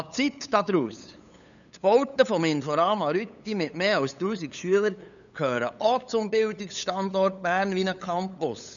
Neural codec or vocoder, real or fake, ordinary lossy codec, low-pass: codec, 16 kHz, 8 kbps, FunCodec, trained on LibriTTS, 25 frames a second; fake; none; 7.2 kHz